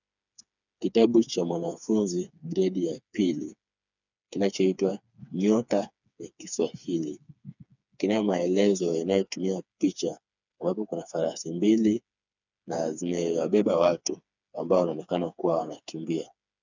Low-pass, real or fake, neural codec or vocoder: 7.2 kHz; fake; codec, 16 kHz, 4 kbps, FreqCodec, smaller model